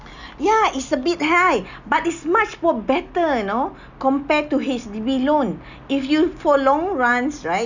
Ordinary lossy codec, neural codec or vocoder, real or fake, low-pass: none; none; real; 7.2 kHz